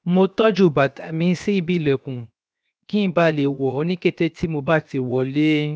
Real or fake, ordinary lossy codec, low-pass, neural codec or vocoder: fake; none; none; codec, 16 kHz, 0.7 kbps, FocalCodec